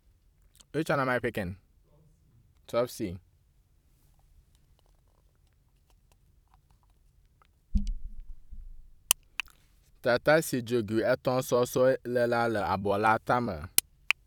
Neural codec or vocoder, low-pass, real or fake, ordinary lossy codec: vocoder, 44.1 kHz, 128 mel bands every 256 samples, BigVGAN v2; 19.8 kHz; fake; none